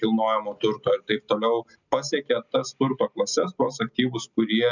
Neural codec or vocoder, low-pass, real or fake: none; 7.2 kHz; real